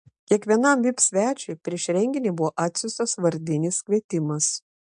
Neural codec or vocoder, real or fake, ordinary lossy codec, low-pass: none; real; MP3, 64 kbps; 9.9 kHz